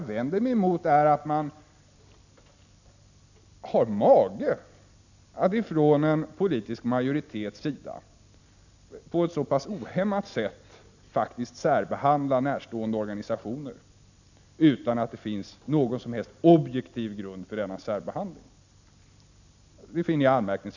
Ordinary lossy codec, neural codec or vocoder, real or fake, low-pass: none; none; real; 7.2 kHz